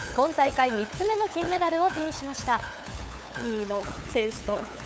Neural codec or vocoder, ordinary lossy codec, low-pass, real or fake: codec, 16 kHz, 8 kbps, FunCodec, trained on LibriTTS, 25 frames a second; none; none; fake